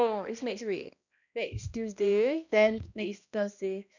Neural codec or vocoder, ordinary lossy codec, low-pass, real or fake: codec, 16 kHz, 1 kbps, X-Codec, HuBERT features, trained on balanced general audio; none; 7.2 kHz; fake